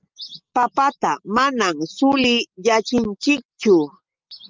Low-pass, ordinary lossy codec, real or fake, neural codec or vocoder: 7.2 kHz; Opus, 24 kbps; real; none